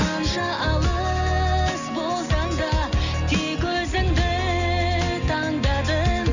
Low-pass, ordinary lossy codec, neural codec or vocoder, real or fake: 7.2 kHz; none; none; real